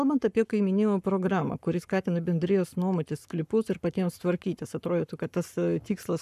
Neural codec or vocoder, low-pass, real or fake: codec, 44.1 kHz, 7.8 kbps, Pupu-Codec; 14.4 kHz; fake